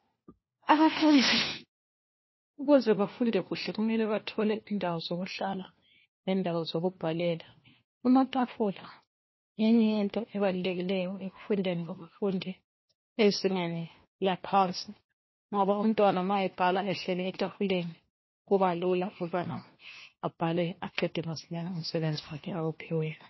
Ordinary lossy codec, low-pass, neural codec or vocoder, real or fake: MP3, 24 kbps; 7.2 kHz; codec, 16 kHz, 1 kbps, FunCodec, trained on LibriTTS, 50 frames a second; fake